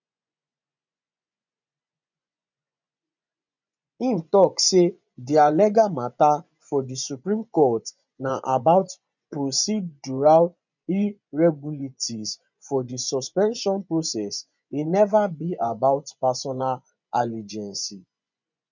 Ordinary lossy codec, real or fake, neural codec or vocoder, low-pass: none; fake; vocoder, 24 kHz, 100 mel bands, Vocos; 7.2 kHz